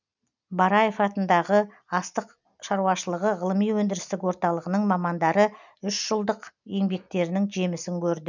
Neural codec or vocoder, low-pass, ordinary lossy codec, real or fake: none; 7.2 kHz; none; real